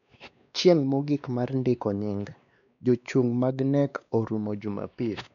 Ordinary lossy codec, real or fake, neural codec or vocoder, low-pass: none; fake; codec, 16 kHz, 2 kbps, X-Codec, WavLM features, trained on Multilingual LibriSpeech; 7.2 kHz